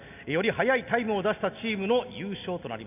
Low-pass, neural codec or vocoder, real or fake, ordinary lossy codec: 3.6 kHz; none; real; none